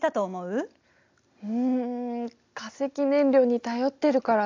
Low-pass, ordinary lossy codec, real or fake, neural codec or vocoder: 7.2 kHz; AAC, 48 kbps; real; none